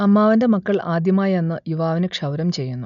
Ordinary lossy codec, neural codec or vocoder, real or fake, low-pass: none; none; real; 7.2 kHz